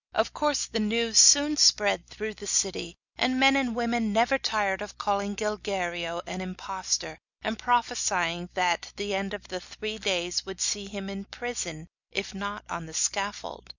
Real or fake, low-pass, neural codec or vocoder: real; 7.2 kHz; none